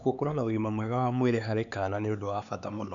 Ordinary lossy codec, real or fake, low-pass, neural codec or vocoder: none; fake; 7.2 kHz; codec, 16 kHz, 4 kbps, X-Codec, HuBERT features, trained on LibriSpeech